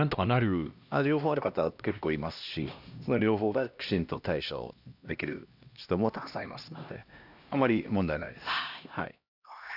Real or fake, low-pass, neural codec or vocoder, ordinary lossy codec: fake; 5.4 kHz; codec, 16 kHz, 1 kbps, X-Codec, HuBERT features, trained on LibriSpeech; none